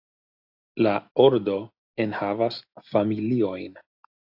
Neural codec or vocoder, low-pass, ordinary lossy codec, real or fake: none; 5.4 kHz; AAC, 48 kbps; real